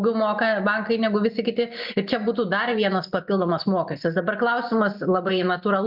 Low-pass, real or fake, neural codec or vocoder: 5.4 kHz; real; none